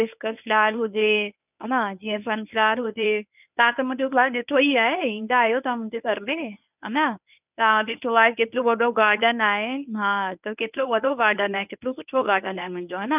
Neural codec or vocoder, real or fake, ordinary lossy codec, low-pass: codec, 24 kHz, 0.9 kbps, WavTokenizer, medium speech release version 1; fake; none; 3.6 kHz